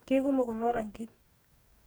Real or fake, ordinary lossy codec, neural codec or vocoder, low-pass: fake; none; codec, 44.1 kHz, 2.6 kbps, DAC; none